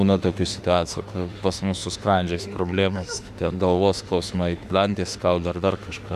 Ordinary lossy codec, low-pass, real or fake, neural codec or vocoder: Opus, 64 kbps; 14.4 kHz; fake; autoencoder, 48 kHz, 32 numbers a frame, DAC-VAE, trained on Japanese speech